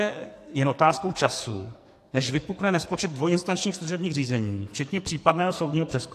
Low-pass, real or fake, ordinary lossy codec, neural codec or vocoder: 14.4 kHz; fake; AAC, 64 kbps; codec, 44.1 kHz, 2.6 kbps, SNAC